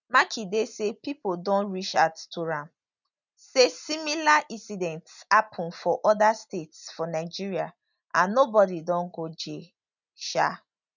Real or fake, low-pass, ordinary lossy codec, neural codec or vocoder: real; 7.2 kHz; none; none